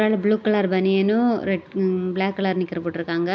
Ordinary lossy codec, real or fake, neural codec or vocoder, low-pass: none; real; none; none